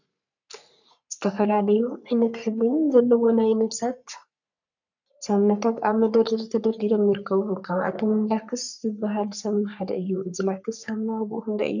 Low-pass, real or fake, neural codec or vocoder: 7.2 kHz; fake; codec, 44.1 kHz, 3.4 kbps, Pupu-Codec